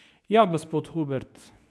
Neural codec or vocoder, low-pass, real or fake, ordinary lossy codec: codec, 24 kHz, 0.9 kbps, WavTokenizer, medium speech release version 2; none; fake; none